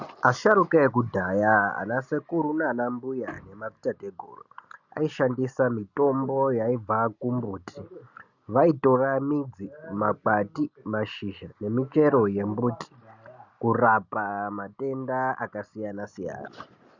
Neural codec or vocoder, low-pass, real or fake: none; 7.2 kHz; real